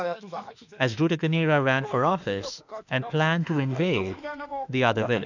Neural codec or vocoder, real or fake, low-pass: autoencoder, 48 kHz, 32 numbers a frame, DAC-VAE, trained on Japanese speech; fake; 7.2 kHz